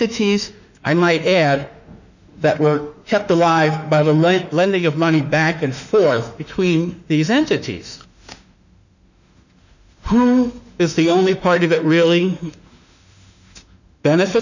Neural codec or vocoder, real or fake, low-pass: autoencoder, 48 kHz, 32 numbers a frame, DAC-VAE, trained on Japanese speech; fake; 7.2 kHz